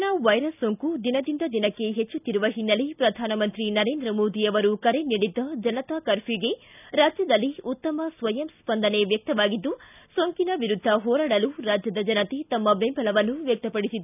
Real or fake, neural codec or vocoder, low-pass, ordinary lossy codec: real; none; 3.6 kHz; none